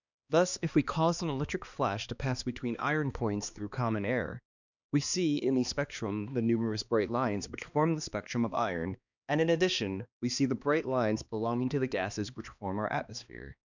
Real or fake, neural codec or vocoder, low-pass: fake; codec, 16 kHz, 2 kbps, X-Codec, HuBERT features, trained on balanced general audio; 7.2 kHz